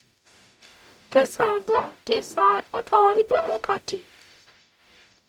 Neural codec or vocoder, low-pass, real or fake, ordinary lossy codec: codec, 44.1 kHz, 0.9 kbps, DAC; 19.8 kHz; fake; MP3, 96 kbps